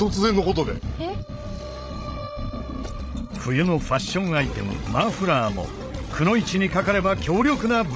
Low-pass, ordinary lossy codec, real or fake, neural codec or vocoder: none; none; fake; codec, 16 kHz, 16 kbps, FreqCodec, larger model